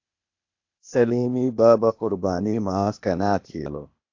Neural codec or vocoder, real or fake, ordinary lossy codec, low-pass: codec, 16 kHz, 0.8 kbps, ZipCodec; fake; AAC, 48 kbps; 7.2 kHz